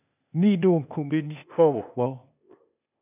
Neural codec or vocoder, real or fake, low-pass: codec, 16 kHz, 0.8 kbps, ZipCodec; fake; 3.6 kHz